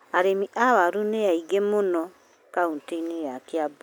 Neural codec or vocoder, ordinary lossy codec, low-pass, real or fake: none; none; none; real